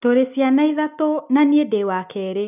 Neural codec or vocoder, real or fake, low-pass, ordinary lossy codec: none; real; 3.6 kHz; none